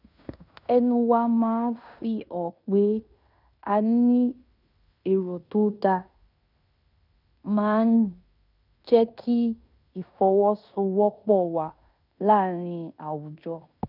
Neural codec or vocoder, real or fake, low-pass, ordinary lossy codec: codec, 16 kHz in and 24 kHz out, 0.9 kbps, LongCat-Audio-Codec, fine tuned four codebook decoder; fake; 5.4 kHz; none